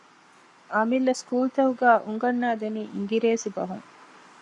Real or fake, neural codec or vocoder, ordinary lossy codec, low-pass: fake; codec, 44.1 kHz, 7.8 kbps, Pupu-Codec; MP3, 48 kbps; 10.8 kHz